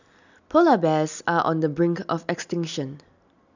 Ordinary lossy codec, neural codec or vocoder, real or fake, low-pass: none; none; real; 7.2 kHz